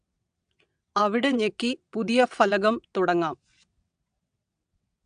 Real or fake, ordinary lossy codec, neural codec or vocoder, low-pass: fake; AAC, 96 kbps; vocoder, 22.05 kHz, 80 mel bands, WaveNeXt; 9.9 kHz